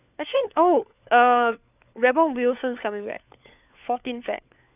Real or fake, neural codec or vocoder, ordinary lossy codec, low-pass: fake; codec, 16 kHz, 4 kbps, FunCodec, trained on LibriTTS, 50 frames a second; none; 3.6 kHz